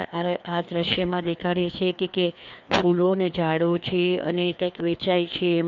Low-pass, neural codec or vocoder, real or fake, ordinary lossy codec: 7.2 kHz; codec, 16 kHz, 2 kbps, FreqCodec, larger model; fake; none